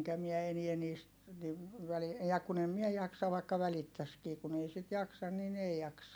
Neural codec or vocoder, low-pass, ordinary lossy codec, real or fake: none; none; none; real